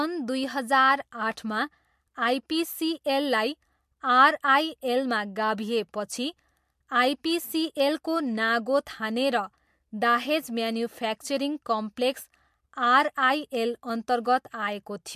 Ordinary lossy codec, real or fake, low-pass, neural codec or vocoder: MP3, 64 kbps; real; 14.4 kHz; none